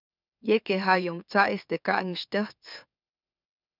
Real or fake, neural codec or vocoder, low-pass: fake; autoencoder, 44.1 kHz, a latent of 192 numbers a frame, MeloTTS; 5.4 kHz